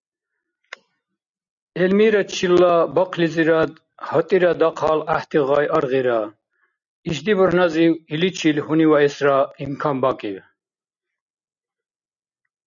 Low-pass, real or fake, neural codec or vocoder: 7.2 kHz; real; none